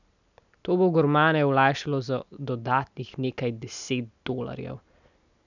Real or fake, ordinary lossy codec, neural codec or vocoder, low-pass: real; none; none; 7.2 kHz